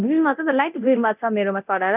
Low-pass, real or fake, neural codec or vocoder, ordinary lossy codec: 3.6 kHz; fake; codec, 24 kHz, 0.9 kbps, DualCodec; none